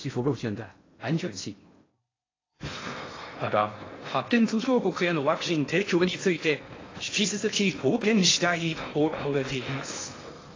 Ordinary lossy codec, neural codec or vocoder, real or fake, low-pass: AAC, 32 kbps; codec, 16 kHz in and 24 kHz out, 0.6 kbps, FocalCodec, streaming, 4096 codes; fake; 7.2 kHz